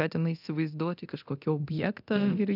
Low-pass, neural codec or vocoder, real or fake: 5.4 kHz; codec, 24 kHz, 0.9 kbps, DualCodec; fake